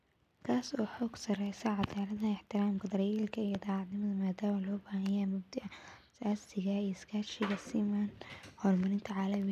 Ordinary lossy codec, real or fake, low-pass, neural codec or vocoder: none; real; 14.4 kHz; none